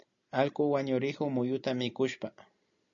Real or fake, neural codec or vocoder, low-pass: real; none; 7.2 kHz